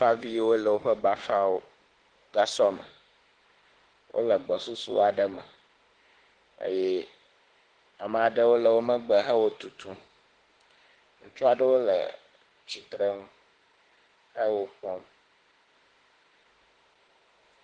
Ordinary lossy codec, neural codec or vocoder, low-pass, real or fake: Opus, 16 kbps; autoencoder, 48 kHz, 32 numbers a frame, DAC-VAE, trained on Japanese speech; 9.9 kHz; fake